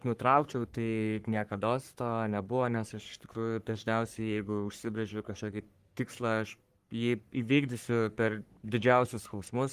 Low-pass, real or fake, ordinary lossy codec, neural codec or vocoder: 14.4 kHz; fake; Opus, 24 kbps; codec, 44.1 kHz, 3.4 kbps, Pupu-Codec